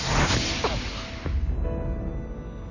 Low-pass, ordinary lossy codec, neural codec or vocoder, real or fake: 7.2 kHz; none; none; real